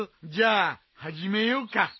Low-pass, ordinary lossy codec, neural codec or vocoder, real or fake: 7.2 kHz; MP3, 24 kbps; none; real